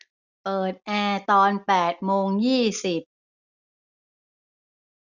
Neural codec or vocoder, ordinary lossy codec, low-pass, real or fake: none; none; 7.2 kHz; real